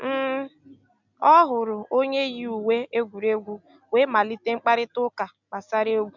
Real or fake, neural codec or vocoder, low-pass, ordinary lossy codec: real; none; 7.2 kHz; none